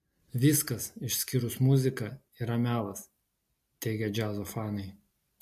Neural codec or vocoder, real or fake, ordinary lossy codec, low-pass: none; real; MP3, 64 kbps; 14.4 kHz